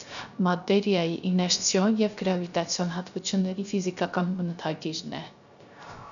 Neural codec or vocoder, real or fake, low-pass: codec, 16 kHz, 0.3 kbps, FocalCodec; fake; 7.2 kHz